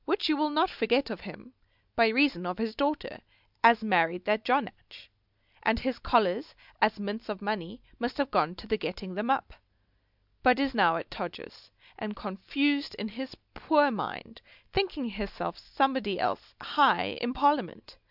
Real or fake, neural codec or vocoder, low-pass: real; none; 5.4 kHz